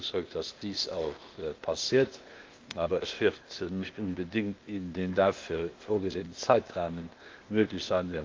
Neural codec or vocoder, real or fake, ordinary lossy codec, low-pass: codec, 16 kHz, 0.8 kbps, ZipCodec; fake; Opus, 24 kbps; 7.2 kHz